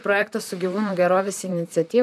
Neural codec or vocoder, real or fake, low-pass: vocoder, 44.1 kHz, 128 mel bands, Pupu-Vocoder; fake; 14.4 kHz